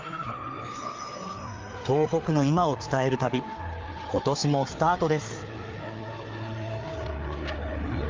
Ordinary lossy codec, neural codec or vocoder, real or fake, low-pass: Opus, 24 kbps; codec, 16 kHz, 4 kbps, FreqCodec, larger model; fake; 7.2 kHz